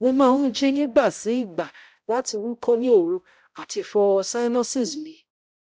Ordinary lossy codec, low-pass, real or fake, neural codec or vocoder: none; none; fake; codec, 16 kHz, 0.5 kbps, X-Codec, HuBERT features, trained on balanced general audio